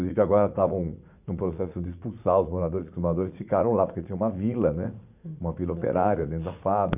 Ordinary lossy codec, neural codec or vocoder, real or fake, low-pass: none; vocoder, 44.1 kHz, 80 mel bands, Vocos; fake; 3.6 kHz